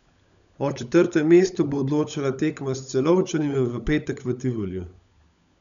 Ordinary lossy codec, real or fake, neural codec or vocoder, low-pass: none; fake; codec, 16 kHz, 16 kbps, FunCodec, trained on LibriTTS, 50 frames a second; 7.2 kHz